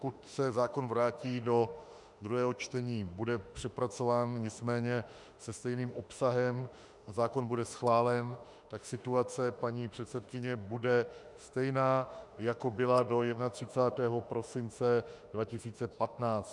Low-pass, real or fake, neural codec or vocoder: 10.8 kHz; fake; autoencoder, 48 kHz, 32 numbers a frame, DAC-VAE, trained on Japanese speech